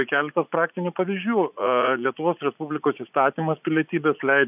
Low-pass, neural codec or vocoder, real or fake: 3.6 kHz; vocoder, 44.1 kHz, 80 mel bands, Vocos; fake